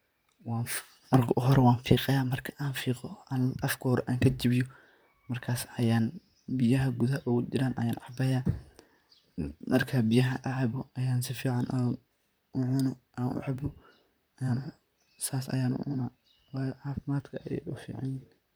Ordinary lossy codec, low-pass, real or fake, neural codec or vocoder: none; none; fake; vocoder, 44.1 kHz, 128 mel bands, Pupu-Vocoder